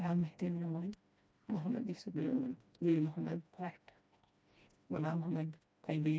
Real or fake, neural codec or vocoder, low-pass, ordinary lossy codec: fake; codec, 16 kHz, 1 kbps, FreqCodec, smaller model; none; none